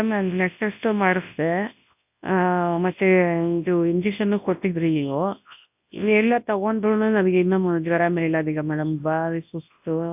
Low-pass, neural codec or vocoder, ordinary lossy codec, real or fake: 3.6 kHz; codec, 24 kHz, 0.9 kbps, WavTokenizer, large speech release; AAC, 32 kbps; fake